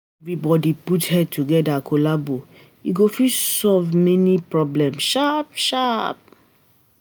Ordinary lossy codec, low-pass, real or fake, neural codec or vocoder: none; none; real; none